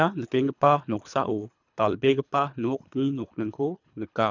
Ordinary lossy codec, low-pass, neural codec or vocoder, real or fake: none; 7.2 kHz; codec, 24 kHz, 3 kbps, HILCodec; fake